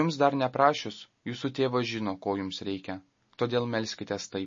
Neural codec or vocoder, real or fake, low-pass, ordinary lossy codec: none; real; 7.2 kHz; MP3, 32 kbps